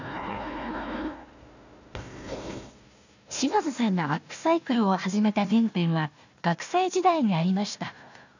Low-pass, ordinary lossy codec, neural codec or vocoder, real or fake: 7.2 kHz; none; codec, 16 kHz, 1 kbps, FunCodec, trained on Chinese and English, 50 frames a second; fake